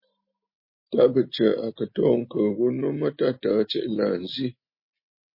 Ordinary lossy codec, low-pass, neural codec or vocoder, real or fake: MP3, 24 kbps; 5.4 kHz; none; real